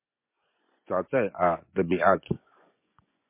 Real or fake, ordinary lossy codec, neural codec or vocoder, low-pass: fake; MP3, 24 kbps; vocoder, 24 kHz, 100 mel bands, Vocos; 3.6 kHz